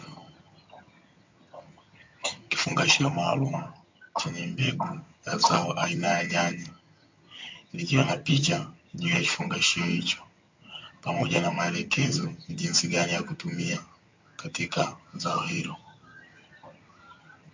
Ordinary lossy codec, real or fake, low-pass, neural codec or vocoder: MP3, 48 kbps; fake; 7.2 kHz; vocoder, 22.05 kHz, 80 mel bands, HiFi-GAN